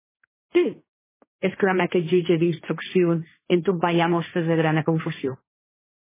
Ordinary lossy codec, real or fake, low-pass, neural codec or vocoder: MP3, 16 kbps; fake; 3.6 kHz; codec, 16 kHz, 1.1 kbps, Voila-Tokenizer